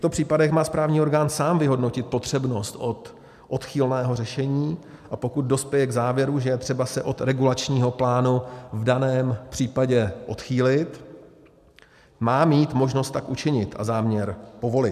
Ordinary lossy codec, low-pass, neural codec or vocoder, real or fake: MP3, 96 kbps; 14.4 kHz; none; real